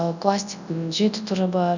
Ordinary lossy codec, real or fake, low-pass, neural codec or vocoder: none; fake; 7.2 kHz; codec, 24 kHz, 0.9 kbps, WavTokenizer, large speech release